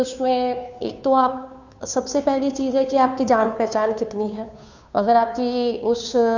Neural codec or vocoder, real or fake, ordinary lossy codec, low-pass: codec, 16 kHz, 2 kbps, FunCodec, trained on Chinese and English, 25 frames a second; fake; none; 7.2 kHz